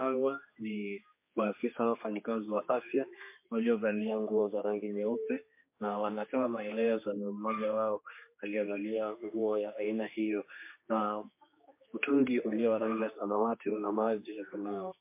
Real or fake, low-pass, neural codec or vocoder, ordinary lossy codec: fake; 3.6 kHz; codec, 16 kHz, 2 kbps, X-Codec, HuBERT features, trained on general audio; MP3, 24 kbps